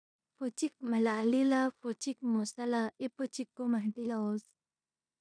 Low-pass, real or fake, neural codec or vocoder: 9.9 kHz; fake; codec, 16 kHz in and 24 kHz out, 0.9 kbps, LongCat-Audio-Codec, four codebook decoder